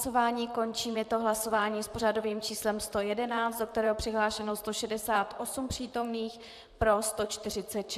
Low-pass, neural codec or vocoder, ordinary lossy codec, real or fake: 14.4 kHz; vocoder, 44.1 kHz, 128 mel bands, Pupu-Vocoder; AAC, 96 kbps; fake